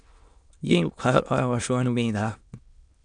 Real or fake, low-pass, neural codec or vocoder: fake; 9.9 kHz; autoencoder, 22.05 kHz, a latent of 192 numbers a frame, VITS, trained on many speakers